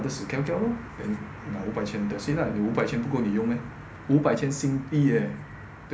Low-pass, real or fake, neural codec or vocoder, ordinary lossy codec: none; real; none; none